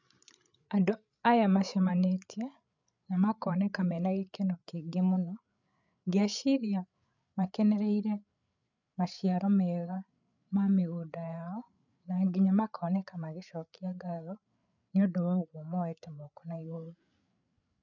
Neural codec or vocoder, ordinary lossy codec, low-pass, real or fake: codec, 16 kHz, 8 kbps, FreqCodec, larger model; none; 7.2 kHz; fake